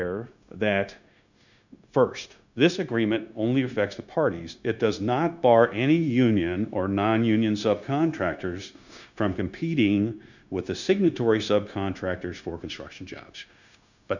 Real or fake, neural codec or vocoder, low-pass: fake; codec, 16 kHz, 0.9 kbps, LongCat-Audio-Codec; 7.2 kHz